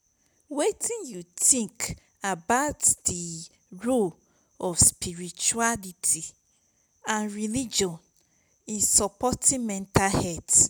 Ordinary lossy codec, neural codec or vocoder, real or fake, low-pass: none; none; real; none